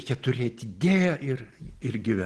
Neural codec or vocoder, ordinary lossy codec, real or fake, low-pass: none; Opus, 16 kbps; real; 10.8 kHz